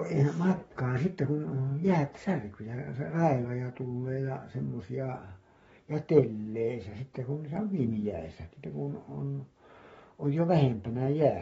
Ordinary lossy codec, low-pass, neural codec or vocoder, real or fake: AAC, 24 kbps; 19.8 kHz; codec, 44.1 kHz, 7.8 kbps, Pupu-Codec; fake